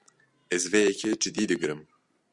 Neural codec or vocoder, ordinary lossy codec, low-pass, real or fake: none; Opus, 64 kbps; 10.8 kHz; real